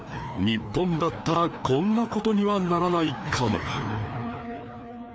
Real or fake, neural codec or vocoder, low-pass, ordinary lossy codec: fake; codec, 16 kHz, 2 kbps, FreqCodec, larger model; none; none